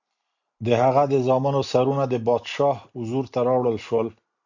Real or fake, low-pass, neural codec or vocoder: real; 7.2 kHz; none